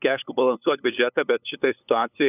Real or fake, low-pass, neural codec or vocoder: fake; 3.6 kHz; codec, 16 kHz, 8 kbps, FunCodec, trained on LibriTTS, 25 frames a second